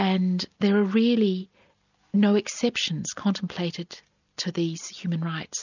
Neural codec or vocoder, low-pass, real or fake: none; 7.2 kHz; real